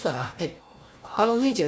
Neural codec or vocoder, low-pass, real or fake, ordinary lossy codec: codec, 16 kHz, 1 kbps, FunCodec, trained on Chinese and English, 50 frames a second; none; fake; none